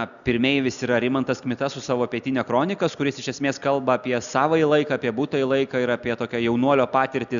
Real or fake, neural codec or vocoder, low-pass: real; none; 7.2 kHz